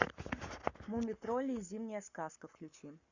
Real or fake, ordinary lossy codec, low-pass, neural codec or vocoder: fake; Opus, 64 kbps; 7.2 kHz; codec, 44.1 kHz, 7.8 kbps, Pupu-Codec